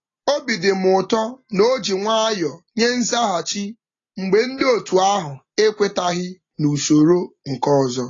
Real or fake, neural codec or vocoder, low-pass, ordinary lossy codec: real; none; 7.2 kHz; AAC, 32 kbps